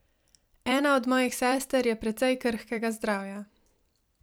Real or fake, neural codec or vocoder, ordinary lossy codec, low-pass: fake; vocoder, 44.1 kHz, 128 mel bands every 256 samples, BigVGAN v2; none; none